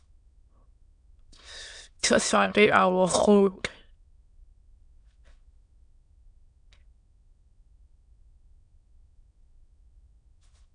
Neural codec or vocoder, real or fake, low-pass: autoencoder, 22.05 kHz, a latent of 192 numbers a frame, VITS, trained on many speakers; fake; 9.9 kHz